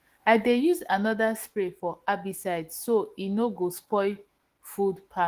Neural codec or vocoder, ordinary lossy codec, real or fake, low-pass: autoencoder, 48 kHz, 128 numbers a frame, DAC-VAE, trained on Japanese speech; Opus, 16 kbps; fake; 14.4 kHz